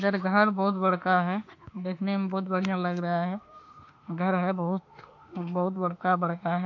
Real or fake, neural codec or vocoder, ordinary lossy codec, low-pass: fake; autoencoder, 48 kHz, 32 numbers a frame, DAC-VAE, trained on Japanese speech; AAC, 48 kbps; 7.2 kHz